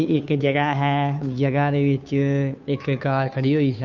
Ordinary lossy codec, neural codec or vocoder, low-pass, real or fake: none; codec, 16 kHz, 2 kbps, FunCodec, trained on Chinese and English, 25 frames a second; 7.2 kHz; fake